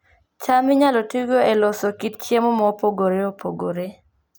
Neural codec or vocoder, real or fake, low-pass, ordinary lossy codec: none; real; none; none